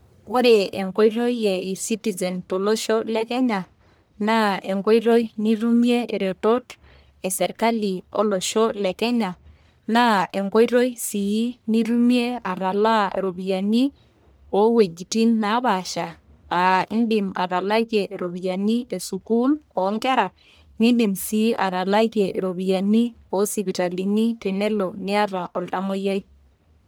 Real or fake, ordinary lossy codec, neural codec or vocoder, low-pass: fake; none; codec, 44.1 kHz, 1.7 kbps, Pupu-Codec; none